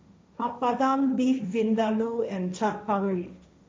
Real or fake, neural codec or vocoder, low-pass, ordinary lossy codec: fake; codec, 16 kHz, 1.1 kbps, Voila-Tokenizer; none; none